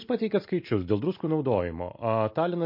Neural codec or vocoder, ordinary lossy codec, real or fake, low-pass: none; MP3, 32 kbps; real; 5.4 kHz